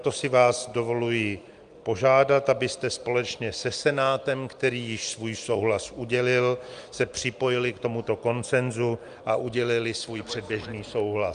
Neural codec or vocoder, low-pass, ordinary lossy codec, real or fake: none; 9.9 kHz; Opus, 32 kbps; real